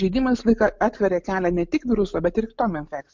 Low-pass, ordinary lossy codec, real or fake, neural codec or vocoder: 7.2 kHz; MP3, 64 kbps; real; none